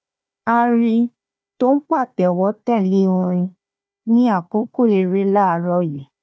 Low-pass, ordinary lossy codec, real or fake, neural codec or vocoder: none; none; fake; codec, 16 kHz, 1 kbps, FunCodec, trained on Chinese and English, 50 frames a second